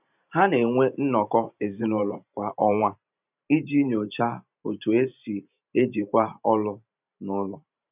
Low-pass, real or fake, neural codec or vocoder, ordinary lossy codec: 3.6 kHz; fake; vocoder, 44.1 kHz, 128 mel bands every 512 samples, BigVGAN v2; none